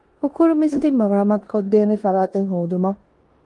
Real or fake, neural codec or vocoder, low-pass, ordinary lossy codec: fake; codec, 16 kHz in and 24 kHz out, 0.9 kbps, LongCat-Audio-Codec, four codebook decoder; 10.8 kHz; Opus, 24 kbps